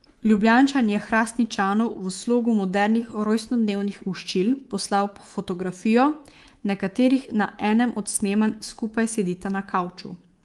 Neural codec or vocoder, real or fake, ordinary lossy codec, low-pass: codec, 24 kHz, 3.1 kbps, DualCodec; fake; Opus, 24 kbps; 10.8 kHz